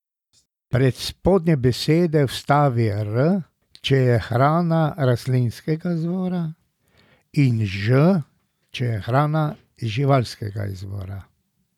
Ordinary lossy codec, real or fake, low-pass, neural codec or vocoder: none; real; 19.8 kHz; none